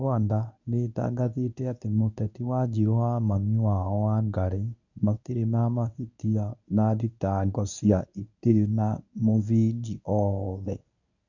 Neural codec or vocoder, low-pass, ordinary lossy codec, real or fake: codec, 24 kHz, 0.9 kbps, WavTokenizer, medium speech release version 1; 7.2 kHz; none; fake